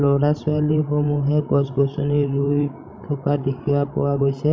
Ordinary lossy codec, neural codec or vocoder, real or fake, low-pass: none; codec, 16 kHz, 16 kbps, FreqCodec, larger model; fake; none